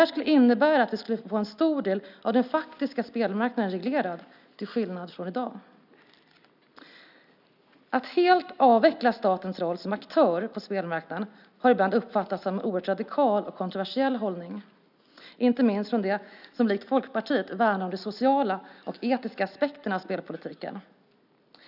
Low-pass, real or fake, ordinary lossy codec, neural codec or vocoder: 5.4 kHz; real; none; none